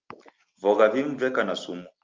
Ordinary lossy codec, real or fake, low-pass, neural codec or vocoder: Opus, 24 kbps; real; 7.2 kHz; none